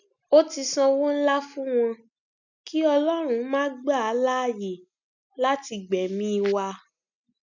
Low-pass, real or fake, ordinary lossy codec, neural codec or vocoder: 7.2 kHz; real; none; none